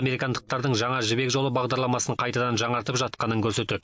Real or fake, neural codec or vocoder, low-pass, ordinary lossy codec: real; none; none; none